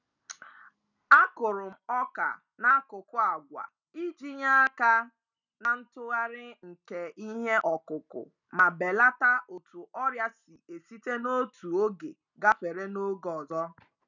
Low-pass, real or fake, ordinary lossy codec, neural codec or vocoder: 7.2 kHz; real; none; none